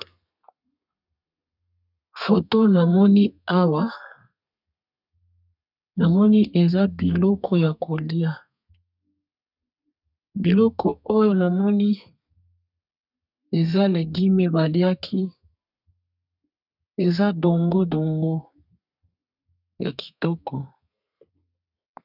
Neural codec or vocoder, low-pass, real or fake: codec, 32 kHz, 1.9 kbps, SNAC; 5.4 kHz; fake